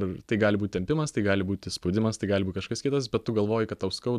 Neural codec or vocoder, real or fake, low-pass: none; real; 14.4 kHz